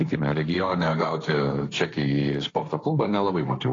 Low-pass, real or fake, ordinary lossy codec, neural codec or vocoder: 7.2 kHz; fake; AAC, 32 kbps; codec, 16 kHz, 1.1 kbps, Voila-Tokenizer